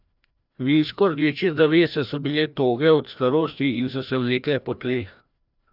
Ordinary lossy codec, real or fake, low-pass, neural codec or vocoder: none; fake; 5.4 kHz; codec, 16 kHz, 1 kbps, FreqCodec, larger model